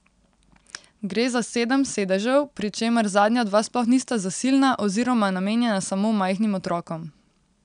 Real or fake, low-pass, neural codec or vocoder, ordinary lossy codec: real; 9.9 kHz; none; none